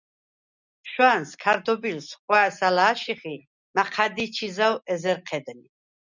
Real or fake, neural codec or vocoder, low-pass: real; none; 7.2 kHz